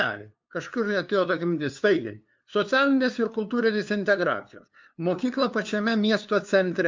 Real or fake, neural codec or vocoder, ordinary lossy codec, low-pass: fake; codec, 16 kHz, 2 kbps, FunCodec, trained on LibriTTS, 25 frames a second; MP3, 64 kbps; 7.2 kHz